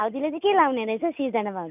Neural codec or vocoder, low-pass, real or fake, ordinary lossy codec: none; 3.6 kHz; real; none